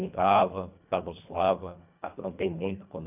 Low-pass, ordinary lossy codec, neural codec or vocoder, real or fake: 3.6 kHz; none; codec, 24 kHz, 1.5 kbps, HILCodec; fake